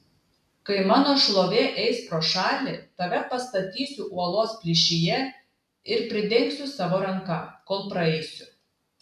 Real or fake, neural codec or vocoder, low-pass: real; none; 14.4 kHz